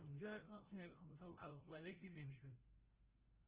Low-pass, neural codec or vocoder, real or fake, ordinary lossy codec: 3.6 kHz; codec, 16 kHz, 0.5 kbps, FreqCodec, larger model; fake; Opus, 16 kbps